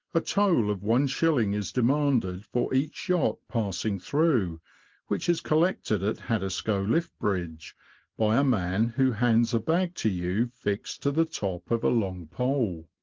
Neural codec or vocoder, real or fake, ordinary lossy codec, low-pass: none; real; Opus, 16 kbps; 7.2 kHz